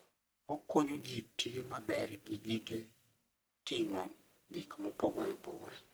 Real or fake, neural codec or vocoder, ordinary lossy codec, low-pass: fake; codec, 44.1 kHz, 1.7 kbps, Pupu-Codec; none; none